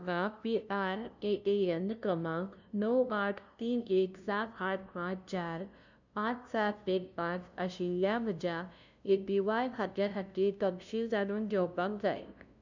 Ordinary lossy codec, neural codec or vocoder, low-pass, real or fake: none; codec, 16 kHz, 0.5 kbps, FunCodec, trained on LibriTTS, 25 frames a second; 7.2 kHz; fake